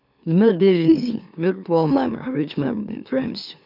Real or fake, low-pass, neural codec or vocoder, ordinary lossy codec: fake; 5.4 kHz; autoencoder, 44.1 kHz, a latent of 192 numbers a frame, MeloTTS; none